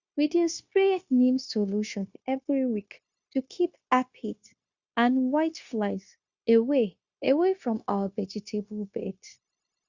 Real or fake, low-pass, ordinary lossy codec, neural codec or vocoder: fake; 7.2 kHz; Opus, 64 kbps; codec, 16 kHz, 0.9 kbps, LongCat-Audio-Codec